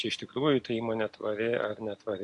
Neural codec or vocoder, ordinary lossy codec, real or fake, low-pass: none; AAC, 64 kbps; real; 10.8 kHz